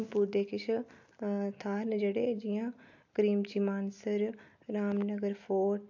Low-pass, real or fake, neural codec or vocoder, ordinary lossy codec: 7.2 kHz; real; none; AAC, 48 kbps